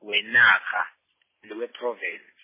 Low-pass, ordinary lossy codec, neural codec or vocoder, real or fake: 3.6 kHz; MP3, 16 kbps; none; real